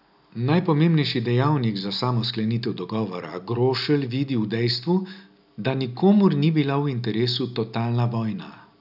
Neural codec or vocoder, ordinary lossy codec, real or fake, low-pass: none; none; real; 5.4 kHz